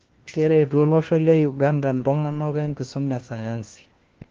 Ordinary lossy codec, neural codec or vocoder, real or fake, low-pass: Opus, 16 kbps; codec, 16 kHz, 1 kbps, FunCodec, trained on LibriTTS, 50 frames a second; fake; 7.2 kHz